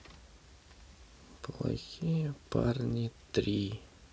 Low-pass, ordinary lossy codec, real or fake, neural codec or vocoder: none; none; real; none